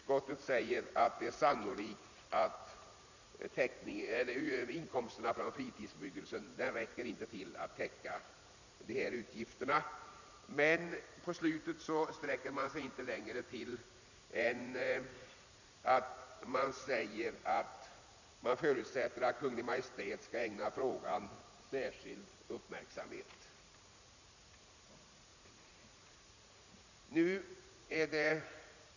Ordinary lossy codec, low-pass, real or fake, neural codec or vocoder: none; 7.2 kHz; fake; vocoder, 44.1 kHz, 80 mel bands, Vocos